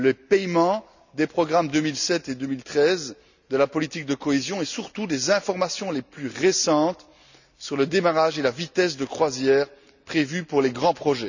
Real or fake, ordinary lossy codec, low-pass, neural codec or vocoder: real; none; 7.2 kHz; none